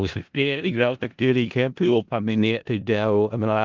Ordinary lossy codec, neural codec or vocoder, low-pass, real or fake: Opus, 32 kbps; codec, 16 kHz in and 24 kHz out, 0.4 kbps, LongCat-Audio-Codec, four codebook decoder; 7.2 kHz; fake